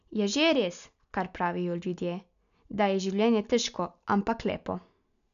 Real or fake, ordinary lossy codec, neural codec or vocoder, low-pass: real; none; none; 7.2 kHz